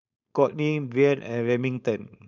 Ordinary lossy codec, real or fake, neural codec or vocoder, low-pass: none; fake; codec, 16 kHz, 4.8 kbps, FACodec; 7.2 kHz